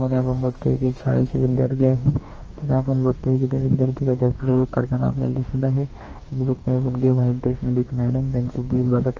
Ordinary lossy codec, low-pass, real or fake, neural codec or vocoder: Opus, 24 kbps; 7.2 kHz; fake; codec, 44.1 kHz, 2.6 kbps, DAC